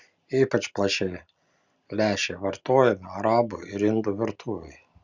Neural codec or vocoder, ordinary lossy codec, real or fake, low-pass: none; Opus, 64 kbps; real; 7.2 kHz